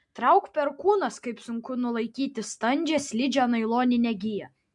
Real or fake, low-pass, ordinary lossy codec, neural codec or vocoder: real; 10.8 kHz; MP3, 64 kbps; none